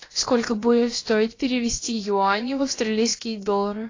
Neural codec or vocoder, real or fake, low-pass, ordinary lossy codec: codec, 16 kHz, about 1 kbps, DyCAST, with the encoder's durations; fake; 7.2 kHz; AAC, 32 kbps